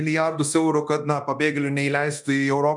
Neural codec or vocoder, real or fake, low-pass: codec, 24 kHz, 0.9 kbps, DualCodec; fake; 10.8 kHz